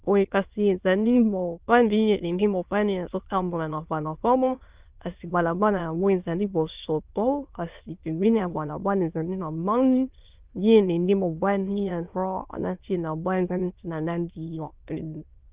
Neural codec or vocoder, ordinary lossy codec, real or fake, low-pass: autoencoder, 22.05 kHz, a latent of 192 numbers a frame, VITS, trained on many speakers; Opus, 32 kbps; fake; 3.6 kHz